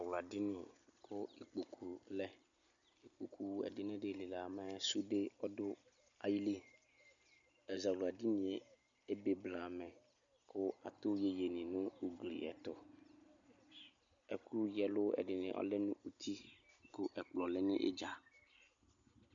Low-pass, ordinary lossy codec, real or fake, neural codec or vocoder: 7.2 kHz; MP3, 64 kbps; real; none